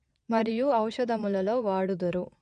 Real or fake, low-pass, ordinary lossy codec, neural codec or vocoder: fake; 9.9 kHz; none; vocoder, 22.05 kHz, 80 mel bands, Vocos